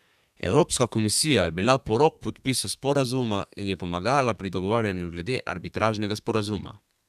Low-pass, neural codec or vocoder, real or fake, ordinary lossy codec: 14.4 kHz; codec, 32 kHz, 1.9 kbps, SNAC; fake; none